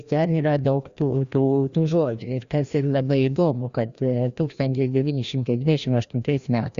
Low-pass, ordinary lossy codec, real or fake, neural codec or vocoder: 7.2 kHz; Opus, 64 kbps; fake; codec, 16 kHz, 1 kbps, FreqCodec, larger model